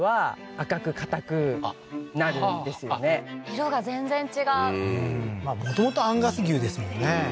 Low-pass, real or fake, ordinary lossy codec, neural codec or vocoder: none; real; none; none